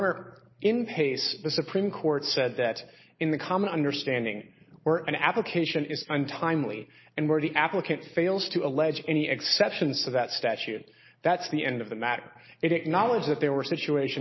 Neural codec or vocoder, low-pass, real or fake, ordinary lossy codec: none; 7.2 kHz; real; MP3, 24 kbps